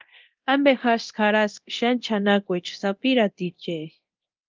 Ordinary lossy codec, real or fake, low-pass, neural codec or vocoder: Opus, 24 kbps; fake; 7.2 kHz; codec, 24 kHz, 0.9 kbps, DualCodec